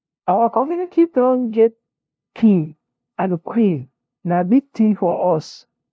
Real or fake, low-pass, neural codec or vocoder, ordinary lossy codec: fake; none; codec, 16 kHz, 0.5 kbps, FunCodec, trained on LibriTTS, 25 frames a second; none